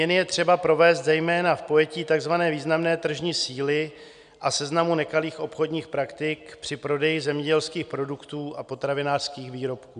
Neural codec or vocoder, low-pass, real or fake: none; 9.9 kHz; real